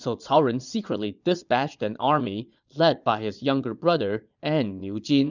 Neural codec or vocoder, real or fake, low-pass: vocoder, 22.05 kHz, 80 mel bands, Vocos; fake; 7.2 kHz